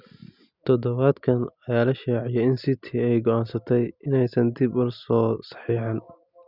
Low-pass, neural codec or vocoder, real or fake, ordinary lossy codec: 5.4 kHz; none; real; Opus, 64 kbps